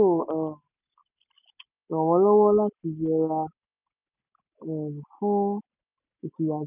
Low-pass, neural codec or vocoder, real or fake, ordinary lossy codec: 3.6 kHz; none; real; none